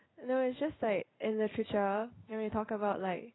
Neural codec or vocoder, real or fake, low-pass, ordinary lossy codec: codec, 16 kHz in and 24 kHz out, 1 kbps, XY-Tokenizer; fake; 7.2 kHz; AAC, 16 kbps